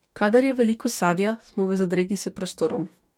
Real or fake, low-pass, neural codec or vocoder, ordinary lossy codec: fake; 19.8 kHz; codec, 44.1 kHz, 2.6 kbps, DAC; MP3, 96 kbps